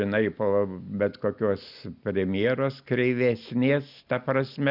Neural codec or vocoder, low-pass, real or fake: none; 5.4 kHz; real